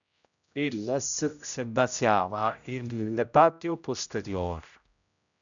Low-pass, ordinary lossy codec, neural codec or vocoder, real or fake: 7.2 kHz; MP3, 96 kbps; codec, 16 kHz, 0.5 kbps, X-Codec, HuBERT features, trained on general audio; fake